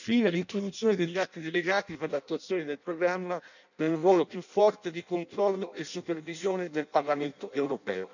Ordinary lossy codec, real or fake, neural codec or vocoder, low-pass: none; fake; codec, 16 kHz in and 24 kHz out, 0.6 kbps, FireRedTTS-2 codec; 7.2 kHz